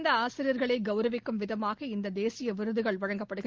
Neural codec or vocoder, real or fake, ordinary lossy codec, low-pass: none; real; Opus, 16 kbps; 7.2 kHz